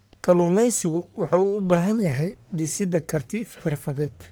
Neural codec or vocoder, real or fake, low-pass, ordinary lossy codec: codec, 44.1 kHz, 1.7 kbps, Pupu-Codec; fake; none; none